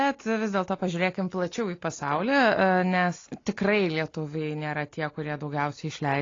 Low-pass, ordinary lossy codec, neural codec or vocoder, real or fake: 7.2 kHz; AAC, 32 kbps; none; real